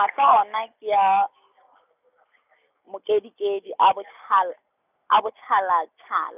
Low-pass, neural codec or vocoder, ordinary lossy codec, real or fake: 3.6 kHz; none; none; real